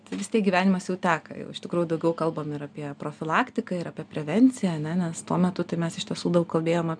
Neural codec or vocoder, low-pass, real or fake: none; 9.9 kHz; real